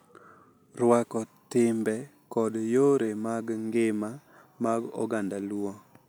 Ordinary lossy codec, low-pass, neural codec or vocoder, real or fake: none; none; none; real